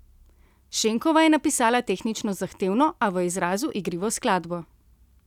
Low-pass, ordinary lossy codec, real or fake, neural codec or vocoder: 19.8 kHz; none; real; none